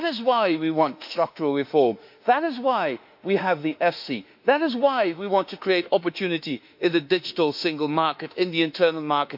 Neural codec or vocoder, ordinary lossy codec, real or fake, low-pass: autoencoder, 48 kHz, 32 numbers a frame, DAC-VAE, trained on Japanese speech; MP3, 48 kbps; fake; 5.4 kHz